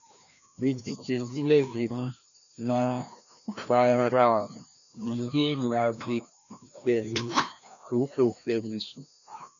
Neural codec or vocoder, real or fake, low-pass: codec, 16 kHz, 1 kbps, FreqCodec, larger model; fake; 7.2 kHz